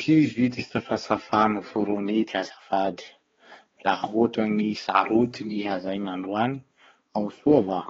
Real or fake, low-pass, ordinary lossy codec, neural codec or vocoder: fake; 7.2 kHz; AAC, 24 kbps; codec, 16 kHz, 2 kbps, X-Codec, HuBERT features, trained on balanced general audio